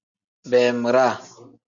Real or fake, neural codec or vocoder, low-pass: real; none; 7.2 kHz